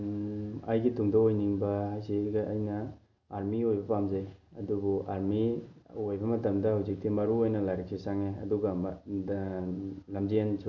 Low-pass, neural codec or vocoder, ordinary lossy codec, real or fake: 7.2 kHz; none; none; real